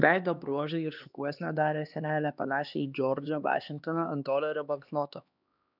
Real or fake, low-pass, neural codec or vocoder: fake; 5.4 kHz; codec, 16 kHz, 2 kbps, X-Codec, HuBERT features, trained on LibriSpeech